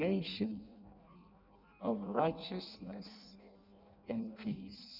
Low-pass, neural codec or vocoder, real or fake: 5.4 kHz; codec, 16 kHz in and 24 kHz out, 0.6 kbps, FireRedTTS-2 codec; fake